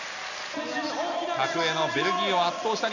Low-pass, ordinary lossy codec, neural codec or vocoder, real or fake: 7.2 kHz; none; none; real